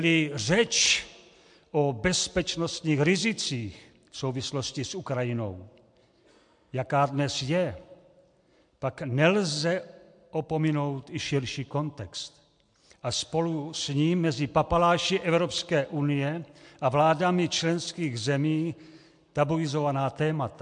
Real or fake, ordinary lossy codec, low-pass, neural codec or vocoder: real; MP3, 64 kbps; 9.9 kHz; none